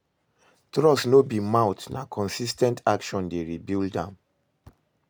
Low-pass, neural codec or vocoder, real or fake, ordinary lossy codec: none; none; real; none